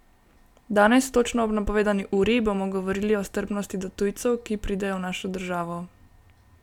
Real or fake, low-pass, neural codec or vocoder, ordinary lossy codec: real; 19.8 kHz; none; none